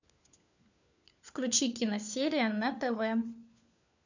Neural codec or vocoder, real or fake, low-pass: codec, 16 kHz, 4 kbps, FunCodec, trained on LibriTTS, 50 frames a second; fake; 7.2 kHz